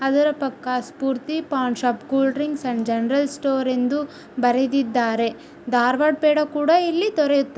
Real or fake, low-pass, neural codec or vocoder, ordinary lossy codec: real; none; none; none